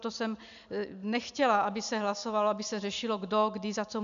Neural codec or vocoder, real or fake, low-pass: none; real; 7.2 kHz